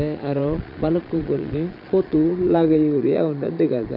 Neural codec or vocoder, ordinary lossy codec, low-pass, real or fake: vocoder, 22.05 kHz, 80 mel bands, Vocos; none; 5.4 kHz; fake